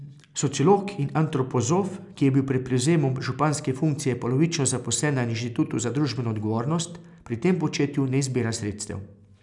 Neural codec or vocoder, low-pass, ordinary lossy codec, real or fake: none; 10.8 kHz; none; real